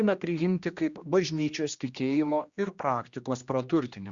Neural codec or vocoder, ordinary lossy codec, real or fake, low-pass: codec, 16 kHz, 1 kbps, X-Codec, HuBERT features, trained on general audio; Opus, 64 kbps; fake; 7.2 kHz